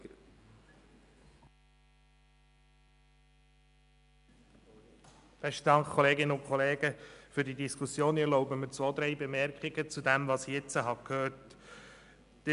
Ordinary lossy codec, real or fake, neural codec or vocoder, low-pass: none; real; none; 10.8 kHz